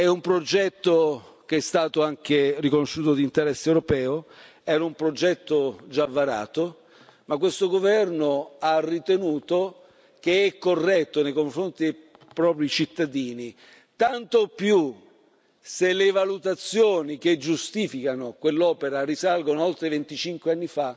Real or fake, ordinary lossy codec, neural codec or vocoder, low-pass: real; none; none; none